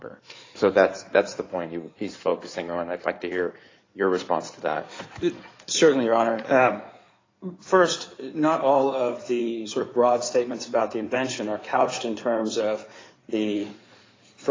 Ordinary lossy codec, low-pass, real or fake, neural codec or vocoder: AAC, 32 kbps; 7.2 kHz; fake; codec, 16 kHz in and 24 kHz out, 2.2 kbps, FireRedTTS-2 codec